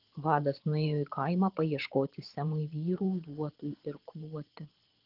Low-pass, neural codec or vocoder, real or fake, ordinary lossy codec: 5.4 kHz; vocoder, 22.05 kHz, 80 mel bands, Vocos; fake; Opus, 16 kbps